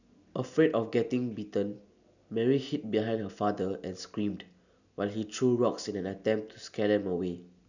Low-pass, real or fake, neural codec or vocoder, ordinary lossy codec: 7.2 kHz; real; none; none